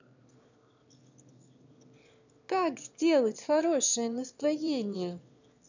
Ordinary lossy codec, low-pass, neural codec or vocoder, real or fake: none; 7.2 kHz; autoencoder, 22.05 kHz, a latent of 192 numbers a frame, VITS, trained on one speaker; fake